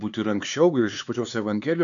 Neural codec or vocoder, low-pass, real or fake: codec, 16 kHz, 4 kbps, X-Codec, HuBERT features, trained on LibriSpeech; 7.2 kHz; fake